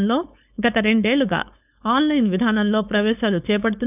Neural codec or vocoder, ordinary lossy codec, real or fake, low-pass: codec, 16 kHz, 4.8 kbps, FACodec; none; fake; 3.6 kHz